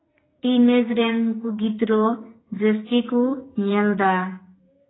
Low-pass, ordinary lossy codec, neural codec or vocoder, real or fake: 7.2 kHz; AAC, 16 kbps; codec, 44.1 kHz, 2.6 kbps, SNAC; fake